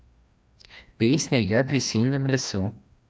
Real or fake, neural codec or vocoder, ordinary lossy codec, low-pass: fake; codec, 16 kHz, 1 kbps, FreqCodec, larger model; none; none